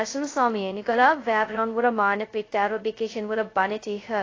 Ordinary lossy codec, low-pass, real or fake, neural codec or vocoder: AAC, 32 kbps; 7.2 kHz; fake; codec, 16 kHz, 0.2 kbps, FocalCodec